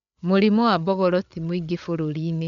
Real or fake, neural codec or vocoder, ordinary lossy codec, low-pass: fake; codec, 16 kHz, 8 kbps, FreqCodec, larger model; none; 7.2 kHz